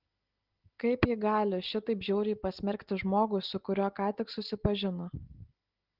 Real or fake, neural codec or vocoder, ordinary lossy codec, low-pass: real; none; Opus, 16 kbps; 5.4 kHz